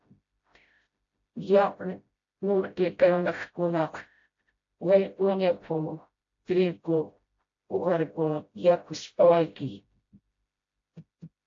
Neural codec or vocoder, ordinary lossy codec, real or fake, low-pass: codec, 16 kHz, 0.5 kbps, FreqCodec, smaller model; AAC, 48 kbps; fake; 7.2 kHz